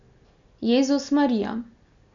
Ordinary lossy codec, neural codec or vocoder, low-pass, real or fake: none; none; 7.2 kHz; real